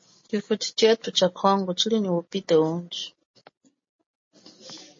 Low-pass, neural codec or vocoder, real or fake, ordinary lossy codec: 7.2 kHz; none; real; MP3, 32 kbps